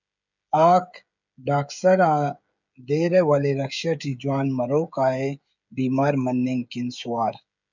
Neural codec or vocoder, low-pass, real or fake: codec, 16 kHz, 16 kbps, FreqCodec, smaller model; 7.2 kHz; fake